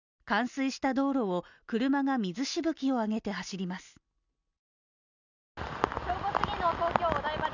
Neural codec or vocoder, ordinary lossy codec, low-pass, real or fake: none; none; 7.2 kHz; real